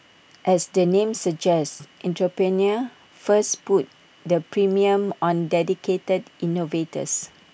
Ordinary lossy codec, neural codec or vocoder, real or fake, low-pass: none; none; real; none